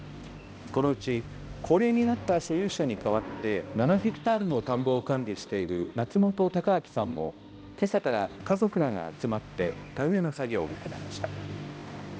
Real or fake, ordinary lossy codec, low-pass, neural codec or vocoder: fake; none; none; codec, 16 kHz, 1 kbps, X-Codec, HuBERT features, trained on balanced general audio